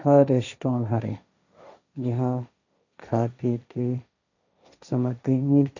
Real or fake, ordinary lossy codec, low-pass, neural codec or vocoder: fake; none; 7.2 kHz; codec, 16 kHz, 1.1 kbps, Voila-Tokenizer